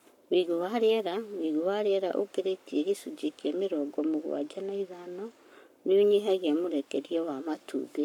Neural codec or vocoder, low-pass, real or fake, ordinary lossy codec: codec, 44.1 kHz, 7.8 kbps, Pupu-Codec; 19.8 kHz; fake; none